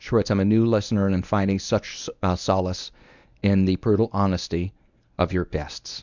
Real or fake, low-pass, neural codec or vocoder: fake; 7.2 kHz; codec, 24 kHz, 0.9 kbps, WavTokenizer, medium speech release version 1